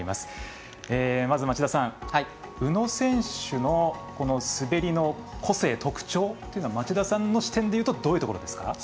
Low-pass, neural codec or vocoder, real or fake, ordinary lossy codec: none; none; real; none